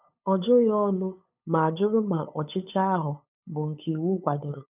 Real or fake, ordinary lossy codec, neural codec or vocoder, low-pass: fake; none; codec, 16 kHz, 8 kbps, FunCodec, trained on LibriTTS, 25 frames a second; 3.6 kHz